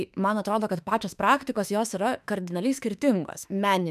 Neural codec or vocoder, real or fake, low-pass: autoencoder, 48 kHz, 32 numbers a frame, DAC-VAE, trained on Japanese speech; fake; 14.4 kHz